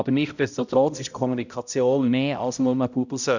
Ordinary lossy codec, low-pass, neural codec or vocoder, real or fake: none; 7.2 kHz; codec, 16 kHz, 0.5 kbps, X-Codec, HuBERT features, trained on balanced general audio; fake